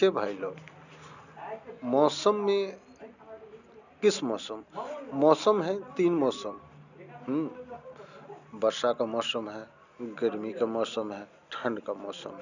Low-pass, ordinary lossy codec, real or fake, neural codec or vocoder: 7.2 kHz; none; real; none